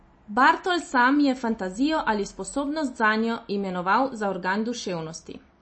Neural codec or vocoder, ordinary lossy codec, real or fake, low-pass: none; MP3, 32 kbps; real; 9.9 kHz